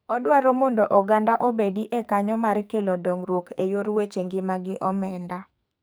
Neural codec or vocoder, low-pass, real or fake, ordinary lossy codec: codec, 44.1 kHz, 2.6 kbps, SNAC; none; fake; none